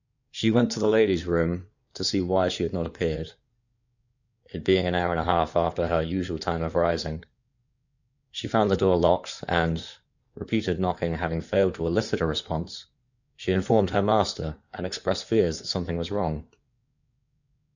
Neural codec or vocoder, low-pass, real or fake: codec, 16 kHz in and 24 kHz out, 2.2 kbps, FireRedTTS-2 codec; 7.2 kHz; fake